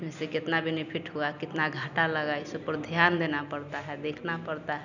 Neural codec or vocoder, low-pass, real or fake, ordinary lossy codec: none; 7.2 kHz; real; none